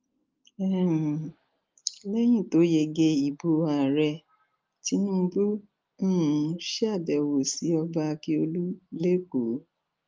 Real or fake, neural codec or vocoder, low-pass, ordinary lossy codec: real; none; 7.2 kHz; Opus, 32 kbps